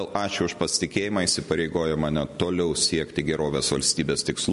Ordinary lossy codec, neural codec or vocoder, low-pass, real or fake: MP3, 48 kbps; none; 14.4 kHz; real